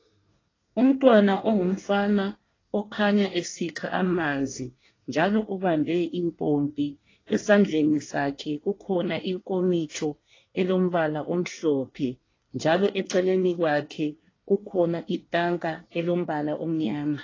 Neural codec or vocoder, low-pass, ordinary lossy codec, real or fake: codec, 24 kHz, 1 kbps, SNAC; 7.2 kHz; AAC, 32 kbps; fake